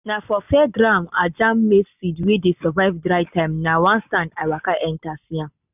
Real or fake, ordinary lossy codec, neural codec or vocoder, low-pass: real; none; none; 3.6 kHz